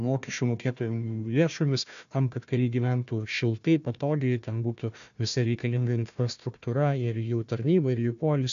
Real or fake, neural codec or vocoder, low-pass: fake; codec, 16 kHz, 1 kbps, FunCodec, trained on Chinese and English, 50 frames a second; 7.2 kHz